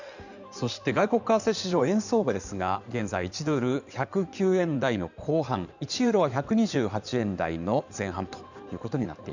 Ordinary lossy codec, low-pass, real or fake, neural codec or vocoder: none; 7.2 kHz; fake; codec, 16 kHz in and 24 kHz out, 2.2 kbps, FireRedTTS-2 codec